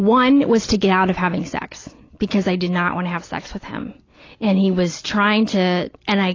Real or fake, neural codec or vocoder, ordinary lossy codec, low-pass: real; none; AAC, 32 kbps; 7.2 kHz